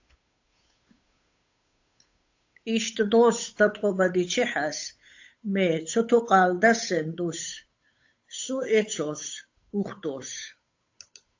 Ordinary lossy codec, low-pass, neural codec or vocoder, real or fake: AAC, 48 kbps; 7.2 kHz; codec, 16 kHz, 8 kbps, FunCodec, trained on Chinese and English, 25 frames a second; fake